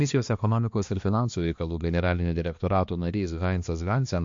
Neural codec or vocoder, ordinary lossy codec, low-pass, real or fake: codec, 16 kHz, 2 kbps, X-Codec, HuBERT features, trained on balanced general audio; MP3, 48 kbps; 7.2 kHz; fake